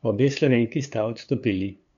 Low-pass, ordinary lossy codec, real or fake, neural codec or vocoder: 7.2 kHz; none; fake; codec, 16 kHz, 2 kbps, FunCodec, trained on LibriTTS, 25 frames a second